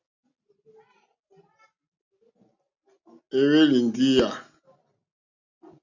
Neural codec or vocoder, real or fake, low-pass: none; real; 7.2 kHz